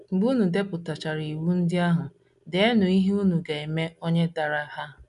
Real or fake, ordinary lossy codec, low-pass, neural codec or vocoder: real; none; 10.8 kHz; none